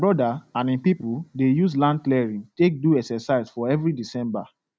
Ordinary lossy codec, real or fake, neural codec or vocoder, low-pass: none; real; none; none